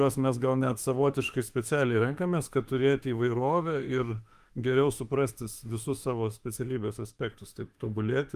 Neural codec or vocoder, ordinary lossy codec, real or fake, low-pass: autoencoder, 48 kHz, 32 numbers a frame, DAC-VAE, trained on Japanese speech; Opus, 32 kbps; fake; 14.4 kHz